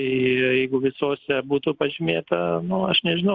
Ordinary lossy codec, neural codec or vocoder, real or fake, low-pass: Opus, 64 kbps; none; real; 7.2 kHz